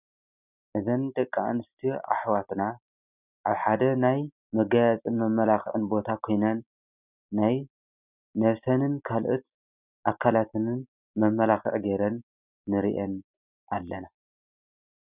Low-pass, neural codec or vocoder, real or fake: 3.6 kHz; none; real